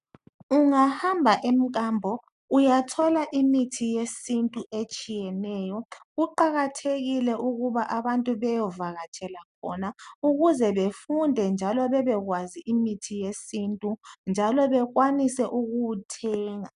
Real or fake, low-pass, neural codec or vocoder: real; 10.8 kHz; none